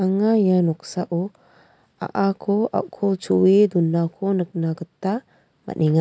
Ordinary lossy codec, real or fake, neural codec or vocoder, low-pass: none; real; none; none